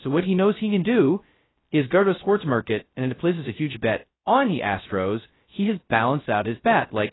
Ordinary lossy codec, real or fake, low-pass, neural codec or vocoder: AAC, 16 kbps; fake; 7.2 kHz; codec, 16 kHz, 0.2 kbps, FocalCodec